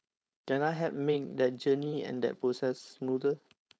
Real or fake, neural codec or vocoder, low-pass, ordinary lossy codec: fake; codec, 16 kHz, 4.8 kbps, FACodec; none; none